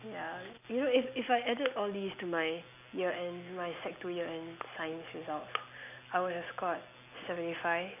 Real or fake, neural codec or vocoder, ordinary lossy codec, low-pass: real; none; none; 3.6 kHz